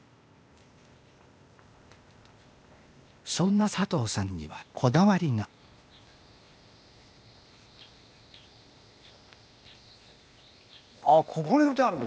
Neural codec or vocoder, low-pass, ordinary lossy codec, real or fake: codec, 16 kHz, 0.8 kbps, ZipCodec; none; none; fake